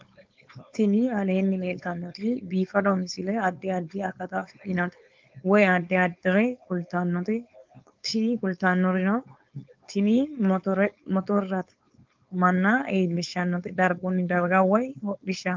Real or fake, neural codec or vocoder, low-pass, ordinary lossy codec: fake; codec, 16 kHz, 4.8 kbps, FACodec; 7.2 kHz; Opus, 24 kbps